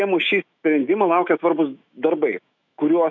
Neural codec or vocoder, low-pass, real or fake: autoencoder, 48 kHz, 128 numbers a frame, DAC-VAE, trained on Japanese speech; 7.2 kHz; fake